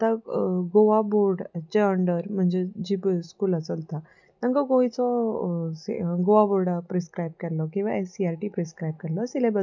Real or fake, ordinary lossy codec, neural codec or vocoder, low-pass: real; none; none; 7.2 kHz